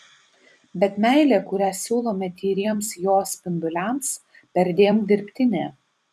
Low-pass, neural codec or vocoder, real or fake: 14.4 kHz; vocoder, 44.1 kHz, 128 mel bands every 256 samples, BigVGAN v2; fake